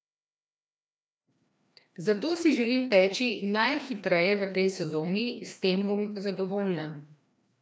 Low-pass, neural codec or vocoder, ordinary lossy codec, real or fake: none; codec, 16 kHz, 1 kbps, FreqCodec, larger model; none; fake